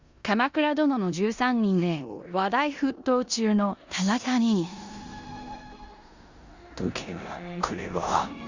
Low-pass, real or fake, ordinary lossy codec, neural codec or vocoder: 7.2 kHz; fake; Opus, 64 kbps; codec, 16 kHz in and 24 kHz out, 0.9 kbps, LongCat-Audio-Codec, four codebook decoder